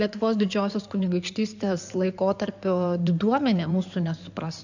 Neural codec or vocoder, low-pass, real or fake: codec, 16 kHz in and 24 kHz out, 2.2 kbps, FireRedTTS-2 codec; 7.2 kHz; fake